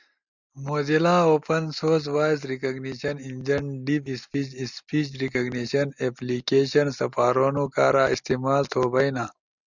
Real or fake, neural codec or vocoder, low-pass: real; none; 7.2 kHz